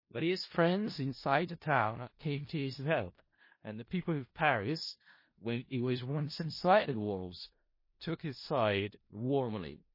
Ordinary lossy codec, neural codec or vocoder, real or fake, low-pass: MP3, 24 kbps; codec, 16 kHz in and 24 kHz out, 0.4 kbps, LongCat-Audio-Codec, four codebook decoder; fake; 5.4 kHz